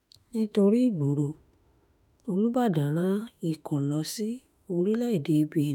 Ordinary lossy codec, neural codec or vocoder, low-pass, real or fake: none; autoencoder, 48 kHz, 32 numbers a frame, DAC-VAE, trained on Japanese speech; 19.8 kHz; fake